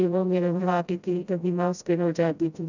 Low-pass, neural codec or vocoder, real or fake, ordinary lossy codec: 7.2 kHz; codec, 16 kHz, 0.5 kbps, FreqCodec, smaller model; fake; none